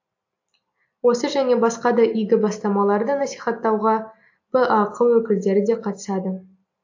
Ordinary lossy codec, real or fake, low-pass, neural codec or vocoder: AAC, 48 kbps; real; 7.2 kHz; none